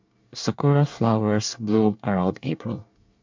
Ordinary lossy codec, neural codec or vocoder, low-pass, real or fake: MP3, 64 kbps; codec, 24 kHz, 1 kbps, SNAC; 7.2 kHz; fake